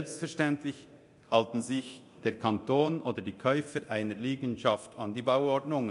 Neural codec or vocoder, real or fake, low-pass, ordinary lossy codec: codec, 24 kHz, 0.9 kbps, DualCodec; fake; 10.8 kHz; MP3, 64 kbps